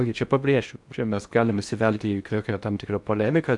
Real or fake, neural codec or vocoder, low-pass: fake; codec, 16 kHz in and 24 kHz out, 0.8 kbps, FocalCodec, streaming, 65536 codes; 10.8 kHz